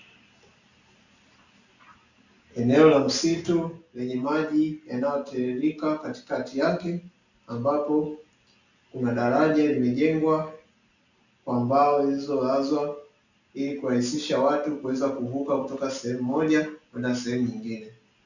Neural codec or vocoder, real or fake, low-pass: none; real; 7.2 kHz